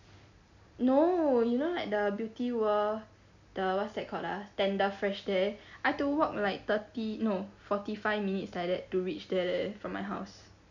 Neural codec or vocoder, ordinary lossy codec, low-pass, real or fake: none; none; 7.2 kHz; real